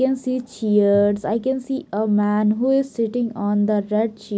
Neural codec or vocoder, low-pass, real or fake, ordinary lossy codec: none; none; real; none